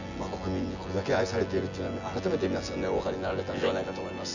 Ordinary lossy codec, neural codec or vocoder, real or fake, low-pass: none; vocoder, 24 kHz, 100 mel bands, Vocos; fake; 7.2 kHz